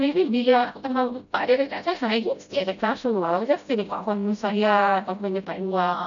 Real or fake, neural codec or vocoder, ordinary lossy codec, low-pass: fake; codec, 16 kHz, 0.5 kbps, FreqCodec, smaller model; none; 7.2 kHz